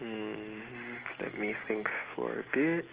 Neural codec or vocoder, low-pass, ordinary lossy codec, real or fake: none; 3.6 kHz; Opus, 16 kbps; real